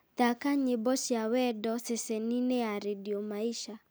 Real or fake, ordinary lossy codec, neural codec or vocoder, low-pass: real; none; none; none